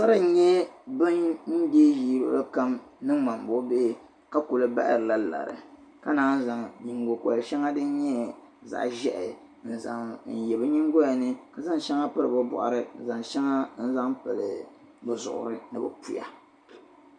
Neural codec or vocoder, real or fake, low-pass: none; real; 9.9 kHz